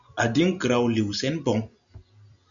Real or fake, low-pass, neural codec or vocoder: real; 7.2 kHz; none